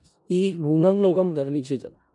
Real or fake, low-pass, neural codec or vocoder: fake; 10.8 kHz; codec, 16 kHz in and 24 kHz out, 0.4 kbps, LongCat-Audio-Codec, four codebook decoder